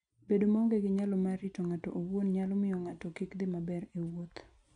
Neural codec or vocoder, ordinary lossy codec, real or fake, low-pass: none; none; real; 9.9 kHz